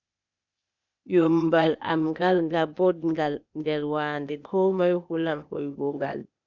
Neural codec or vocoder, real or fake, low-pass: codec, 16 kHz, 0.8 kbps, ZipCodec; fake; 7.2 kHz